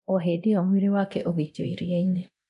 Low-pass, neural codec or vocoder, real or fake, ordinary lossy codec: 10.8 kHz; codec, 24 kHz, 0.9 kbps, DualCodec; fake; none